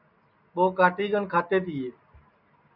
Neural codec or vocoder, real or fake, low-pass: none; real; 5.4 kHz